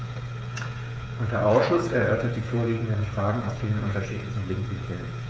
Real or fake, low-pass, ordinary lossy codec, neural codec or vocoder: fake; none; none; codec, 16 kHz, 8 kbps, FreqCodec, smaller model